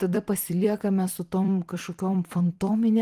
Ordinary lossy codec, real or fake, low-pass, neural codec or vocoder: Opus, 24 kbps; fake; 14.4 kHz; vocoder, 44.1 kHz, 128 mel bands every 256 samples, BigVGAN v2